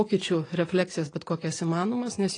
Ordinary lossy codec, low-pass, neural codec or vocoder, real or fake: AAC, 32 kbps; 9.9 kHz; none; real